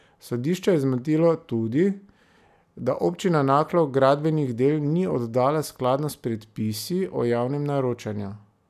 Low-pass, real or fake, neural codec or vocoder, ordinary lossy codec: 14.4 kHz; real; none; none